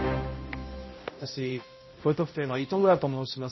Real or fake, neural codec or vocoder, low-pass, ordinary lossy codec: fake; codec, 16 kHz, 0.5 kbps, X-Codec, HuBERT features, trained on balanced general audio; 7.2 kHz; MP3, 24 kbps